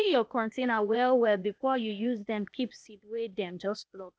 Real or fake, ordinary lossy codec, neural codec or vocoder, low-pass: fake; none; codec, 16 kHz, 0.8 kbps, ZipCodec; none